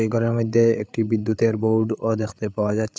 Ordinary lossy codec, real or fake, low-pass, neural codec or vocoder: none; fake; none; codec, 16 kHz, 8 kbps, FreqCodec, larger model